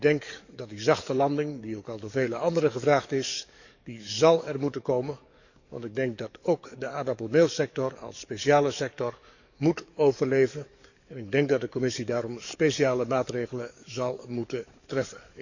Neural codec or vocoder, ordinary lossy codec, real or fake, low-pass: codec, 44.1 kHz, 7.8 kbps, DAC; none; fake; 7.2 kHz